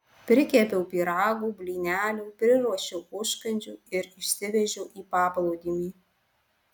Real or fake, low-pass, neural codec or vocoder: real; 19.8 kHz; none